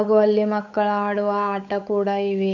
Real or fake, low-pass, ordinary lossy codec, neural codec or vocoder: fake; 7.2 kHz; none; codec, 16 kHz, 8 kbps, FunCodec, trained on Chinese and English, 25 frames a second